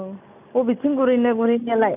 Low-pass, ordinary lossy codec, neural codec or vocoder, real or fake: 3.6 kHz; none; none; real